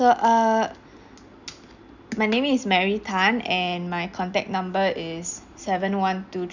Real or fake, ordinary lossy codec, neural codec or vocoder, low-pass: real; none; none; 7.2 kHz